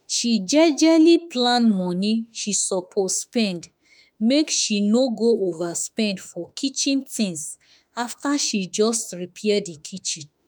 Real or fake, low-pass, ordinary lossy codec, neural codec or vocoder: fake; none; none; autoencoder, 48 kHz, 32 numbers a frame, DAC-VAE, trained on Japanese speech